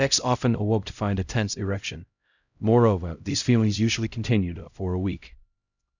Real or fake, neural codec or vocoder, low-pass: fake; codec, 16 kHz, 0.5 kbps, X-Codec, HuBERT features, trained on LibriSpeech; 7.2 kHz